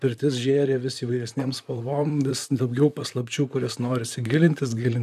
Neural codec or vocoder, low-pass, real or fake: vocoder, 44.1 kHz, 128 mel bands, Pupu-Vocoder; 14.4 kHz; fake